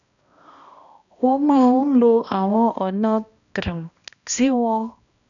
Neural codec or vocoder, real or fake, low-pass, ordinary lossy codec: codec, 16 kHz, 1 kbps, X-Codec, HuBERT features, trained on balanced general audio; fake; 7.2 kHz; none